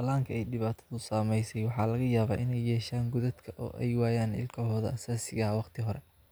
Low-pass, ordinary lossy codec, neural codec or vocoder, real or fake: none; none; none; real